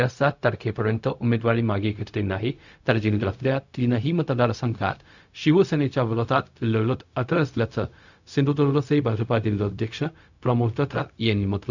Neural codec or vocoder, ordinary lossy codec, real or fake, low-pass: codec, 16 kHz, 0.4 kbps, LongCat-Audio-Codec; none; fake; 7.2 kHz